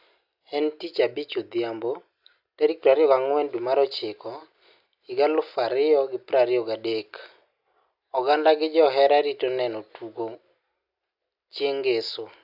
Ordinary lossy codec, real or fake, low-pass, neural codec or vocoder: AAC, 48 kbps; real; 5.4 kHz; none